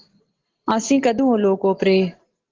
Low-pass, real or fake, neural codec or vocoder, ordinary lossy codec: 7.2 kHz; real; none; Opus, 16 kbps